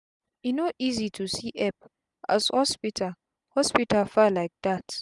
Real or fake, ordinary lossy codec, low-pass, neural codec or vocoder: real; none; 10.8 kHz; none